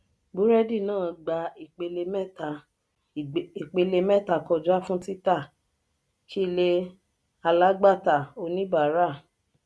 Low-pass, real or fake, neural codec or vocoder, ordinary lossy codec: none; real; none; none